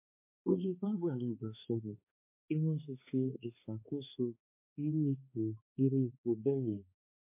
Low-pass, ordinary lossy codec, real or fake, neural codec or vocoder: 3.6 kHz; MP3, 24 kbps; fake; codec, 16 kHz, 2 kbps, X-Codec, HuBERT features, trained on balanced general audio